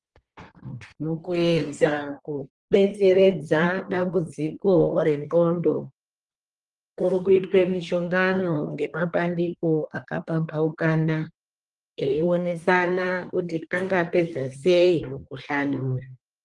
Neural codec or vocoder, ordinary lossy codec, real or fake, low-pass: codec, 24 kHz, 1 kbps, SNAC; Opus, 24 kbps; fake; 10.8 kHz